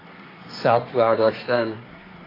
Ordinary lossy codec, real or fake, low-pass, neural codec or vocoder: AAC, 24 kbps; fake; 5.4 kHz; codec, 24 kHz, 1 kbps, SNAC